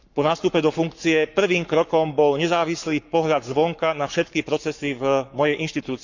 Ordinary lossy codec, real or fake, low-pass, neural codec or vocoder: none; fake; 7.2 kHz; codec, 44.1 kHz, 7.8 kbps, Pupu-Codec